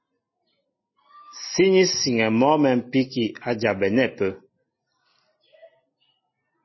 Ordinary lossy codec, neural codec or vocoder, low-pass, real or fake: MP3, 24 kbps; none; 7.2 kHz; real